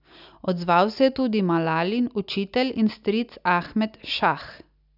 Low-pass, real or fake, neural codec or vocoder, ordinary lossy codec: 5.4 kHz; real; none; none